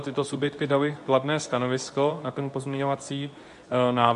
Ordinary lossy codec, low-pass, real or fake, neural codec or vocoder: AAC, 64 kbps; 10.8 kHz; fake; codec, 24 kHz, 0.9 kbps, WavTokenizer, medium speech release version 2